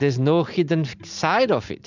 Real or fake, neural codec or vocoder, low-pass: real; none; 7.2 kHz